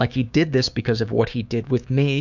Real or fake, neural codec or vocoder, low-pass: fake; codec, 16 kHz, 6 kbps, DAC; 7.2 kHz